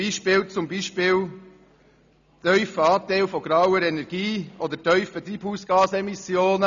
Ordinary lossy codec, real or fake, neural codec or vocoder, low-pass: MP3, 96 kbps; real; none; 7.2 kHz